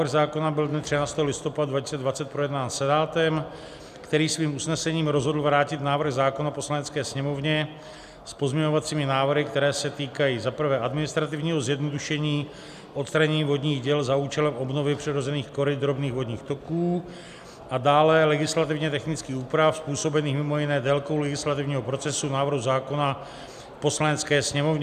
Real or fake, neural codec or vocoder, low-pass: real; none; 14.4 kHz